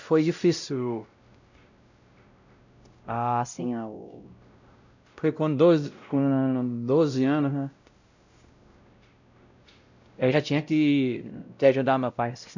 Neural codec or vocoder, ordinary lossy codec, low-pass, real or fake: codec, 16 kHz, 0.5 kbps, X-Codec, WavLM features, trained on Multilingual LibriSpeech; none; 7.2 kHz; fake